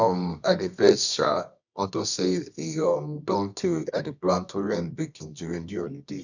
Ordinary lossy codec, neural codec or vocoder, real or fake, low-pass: none; codec, 24 kHz, 0.9 kbps, WavTokenizer, medium music audio release; fake; 7.2 kHz